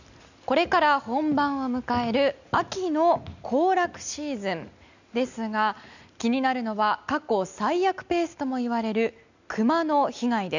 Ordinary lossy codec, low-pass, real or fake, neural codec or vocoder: none; 7.2 kHz; real; none